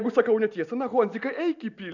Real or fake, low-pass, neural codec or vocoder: real; 7.2 kHz; none